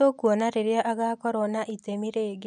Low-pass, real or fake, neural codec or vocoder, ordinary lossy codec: 10.8 kHz; real; none; none